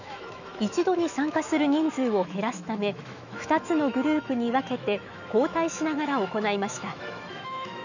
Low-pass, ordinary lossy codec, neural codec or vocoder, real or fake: 7.2 kHz; none; autoencoder, 48 kHz, 128 numbers a frame, DAC-VAE, trained on Japanese speech; fake